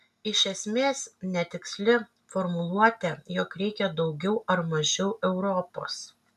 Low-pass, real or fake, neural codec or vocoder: 14.4 kHz; real; none